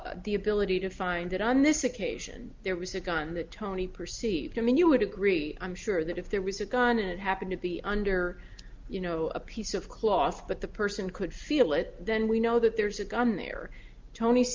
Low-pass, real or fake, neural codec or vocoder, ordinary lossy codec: 7.2 kHz; real; none; Opus, 32 kbps